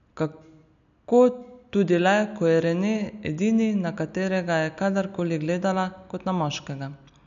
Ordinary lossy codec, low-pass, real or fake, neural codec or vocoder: none; 7.2 kHz; real; none